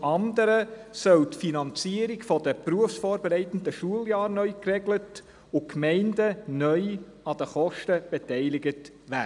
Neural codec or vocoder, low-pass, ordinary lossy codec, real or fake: none; 10.8 kHz; none; real